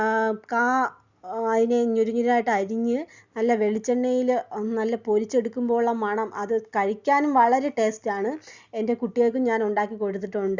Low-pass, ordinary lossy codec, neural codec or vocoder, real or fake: 7.2 kHz; Opus, 64 kbps; none; real